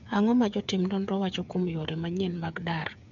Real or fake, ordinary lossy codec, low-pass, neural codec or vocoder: fake; MP3, 64 kbps; 7.2 kHz; codec, 16 kHz, 8 kbps, FreqCodec, smaller model